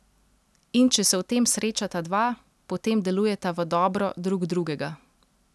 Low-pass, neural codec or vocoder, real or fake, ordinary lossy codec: none; none; real; none